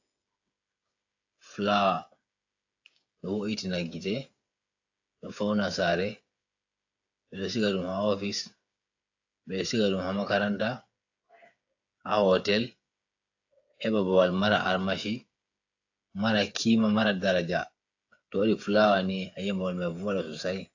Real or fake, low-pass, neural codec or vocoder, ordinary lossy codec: fake; 7.2 kHz; codec, 16 kHz, 8 kbps, FreqCodec, smaller model; AAC, 48 kbps